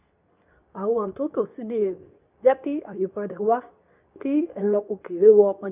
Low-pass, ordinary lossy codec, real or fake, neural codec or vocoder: 3.6 kHz; none; fake; codec, 24 kHz, 0.9 kbps, WavTokenizer, medium speech release version 2